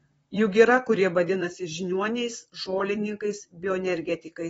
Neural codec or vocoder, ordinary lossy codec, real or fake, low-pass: vocoder, 44.1 kHz, 128 mel bands, Pupu-Vocoder; AAC, 24 kbps; fake; 19.8 kHz